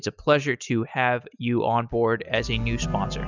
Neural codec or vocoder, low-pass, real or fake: none; 7.2 kHz; real